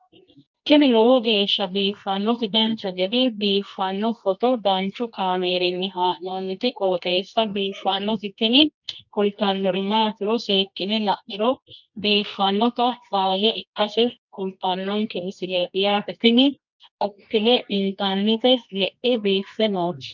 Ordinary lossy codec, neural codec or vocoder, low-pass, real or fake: MP3, 64 kbps; codec, 24 kHz, 0.9 kbps, WavTokenizer, medium music audio release; 7.2 kHz; fake